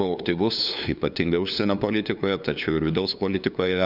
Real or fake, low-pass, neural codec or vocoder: fake; 5.4 kHz; codec, 16 kHz, 2 kbps, FunCodec, trained on LibriTTS, 25 frames a second